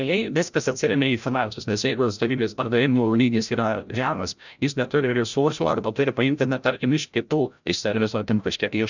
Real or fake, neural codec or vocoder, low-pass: fake; codec, 16 kHz, 0.5 kbps, FreqCodec, larger model; 7.2 kHz